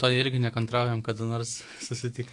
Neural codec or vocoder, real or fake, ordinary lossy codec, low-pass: autoencoder, 48 kHz, 128 numbers a frame, DAC-VAE, trained on Japanese speech; fake; AAC, 48 kbps; 10.8 kHz